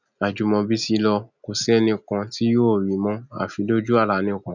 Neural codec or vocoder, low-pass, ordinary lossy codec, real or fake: none; 7.2 kHz; none; real